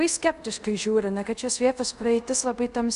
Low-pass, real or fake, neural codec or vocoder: 10.8 kHz; fake; codec, 24 kHz, 0.5 kbps, DualCodec